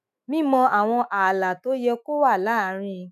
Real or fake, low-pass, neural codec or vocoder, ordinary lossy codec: fake; 14.4 kHz; autoencoder, 48 kHz, 128 numbers a frame, DAC-VAE, trained on Japanese speech; none